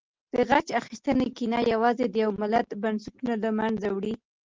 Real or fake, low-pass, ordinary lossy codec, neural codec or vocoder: real; 7.2 kHz; Opus, 16 kbps; none